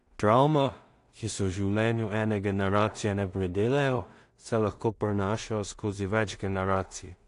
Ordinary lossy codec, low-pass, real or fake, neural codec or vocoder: AAC, 48 kbps; 10.8 kHz; fake; codec, 16 kHz in and 24 kHz out, 0.4 kbps, LongCat-Audio-Codec, two codebook decoder